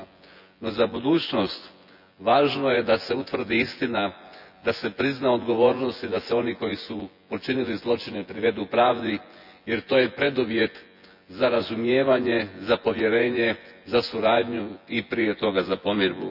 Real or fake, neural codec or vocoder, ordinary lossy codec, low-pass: fake; vocoder, 24 kHz, 100 mel bands, Vocos; none; 5.4 kHz